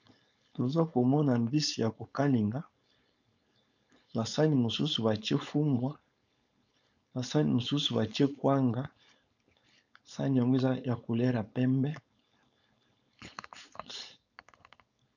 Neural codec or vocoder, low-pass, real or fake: codec, 16 kHz, 4.8 kbps, FACodec; 7.2 kHz; fake